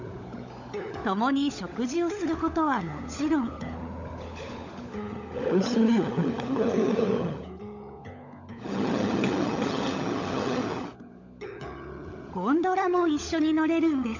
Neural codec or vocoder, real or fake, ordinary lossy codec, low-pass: codec, 16 kHz, 16 kbps, FunCodec, trained on LibriTTS, 50 frames a second; fake; none; 7.2 kHz